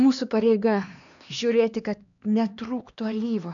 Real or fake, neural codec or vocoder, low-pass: fake; codec, 16 kHz, 2 kbps, X-Codec, HuBERT features, trained on LibriSpeech; 7.2 kHz